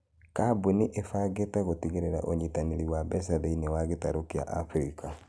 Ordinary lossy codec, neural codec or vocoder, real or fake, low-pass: none; none; real; none